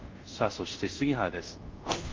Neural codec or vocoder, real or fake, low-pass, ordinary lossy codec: codec, 24 kHz, 0.5 kbps, DualCodec; fake; 7.2 kHz; Opus, 32 kbps